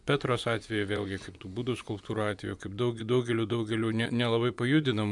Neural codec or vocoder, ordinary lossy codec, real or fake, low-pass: vocoder, 24 kHz, 100 mel bands, Vocos; MP3, 96 kbps; fake; 10.8 kHz